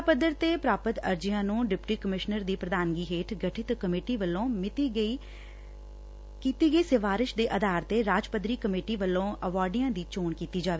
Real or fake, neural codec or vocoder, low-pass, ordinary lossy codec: real; none; none; none